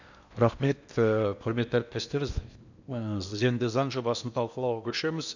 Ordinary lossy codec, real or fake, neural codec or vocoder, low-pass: none; fake; codec, 16 kHz in and 24 kHz out, 0.8 kbps, FocalCodec, streaming, 65536 codes; 7.2 kHz